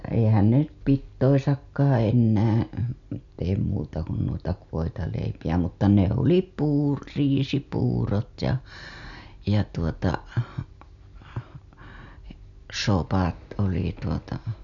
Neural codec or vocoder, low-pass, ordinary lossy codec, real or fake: none; 7.2 kHz; none; real